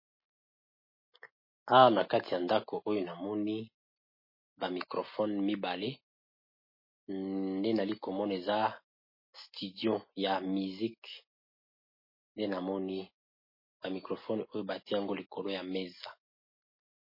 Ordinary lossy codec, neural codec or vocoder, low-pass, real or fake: MP3, 24 kbps; none; 5.4 kHz; real